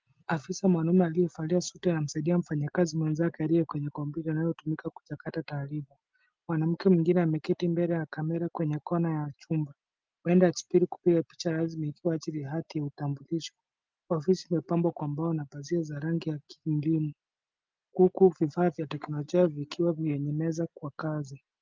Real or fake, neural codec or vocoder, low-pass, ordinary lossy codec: real; none; 7.2 kHz; Opus, 24 kbps